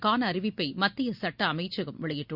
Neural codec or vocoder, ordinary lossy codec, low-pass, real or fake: none; Opus, 64 kbps; 5.4 kHz; real